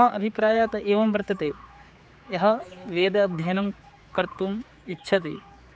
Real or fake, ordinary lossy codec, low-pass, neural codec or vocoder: fake; none; none; codec, 16 kHz, 4 kbps, X-Codec, HuBERT features, trained on general audio